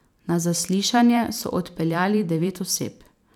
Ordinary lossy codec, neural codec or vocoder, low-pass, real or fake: none; vocoder, 44.1 kHz, 128 mel bands every 512 samples, BigVGAN v2; 19.8 kHz; fake